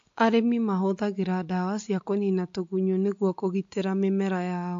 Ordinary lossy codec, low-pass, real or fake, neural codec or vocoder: AAC, 48 kbps; 7.2 kHz; real; none